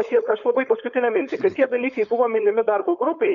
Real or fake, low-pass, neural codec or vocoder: fake; 7.2 kHz; codec, 16 kHz, 4.8 kbps, FACodec